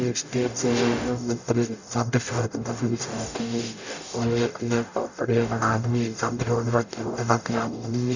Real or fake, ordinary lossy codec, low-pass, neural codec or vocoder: fake; none; 7.2 kHz; codec, 44.1 kHz, 0.9 kbps, DAC